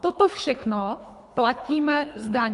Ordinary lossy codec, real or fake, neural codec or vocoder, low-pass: AAC, 64 kbps; fake; codec, 24 kHz, 3 kbps, HILCodec; 10.8 kHz